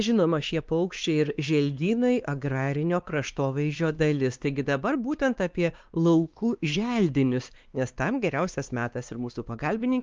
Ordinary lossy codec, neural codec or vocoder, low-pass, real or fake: Opus, 24 kbps; codec, 16 kHz, 2 kbps, X-Codec, WavLM features, trained on Multilingual LibriSpeech; 7.2 kHz; fake